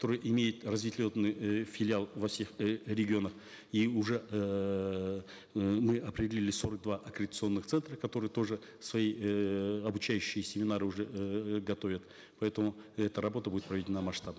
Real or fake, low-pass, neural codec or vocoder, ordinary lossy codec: real; none; none; none